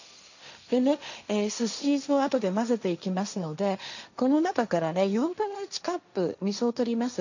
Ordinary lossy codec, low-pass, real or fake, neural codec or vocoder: none; 7.2 kHz; fake; codec, 16 kHz, 1.1 kbps, Voila-Tokenizer